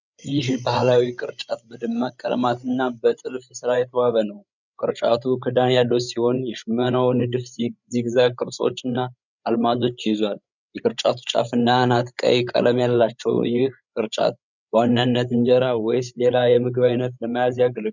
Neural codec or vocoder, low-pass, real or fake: codec, 16 kHz, 8 kbps, FreqCodec, larger model; 7.2 kHz; fake